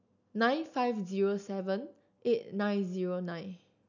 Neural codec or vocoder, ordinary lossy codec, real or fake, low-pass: none; none; real; 7.2 kHz